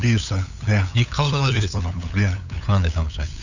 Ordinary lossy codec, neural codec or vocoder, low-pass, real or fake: none; codec, 16 kHz, 8 kbps, FunCodec, trained on LibriTTS, 25 frames a second; 7.2 kHz; fake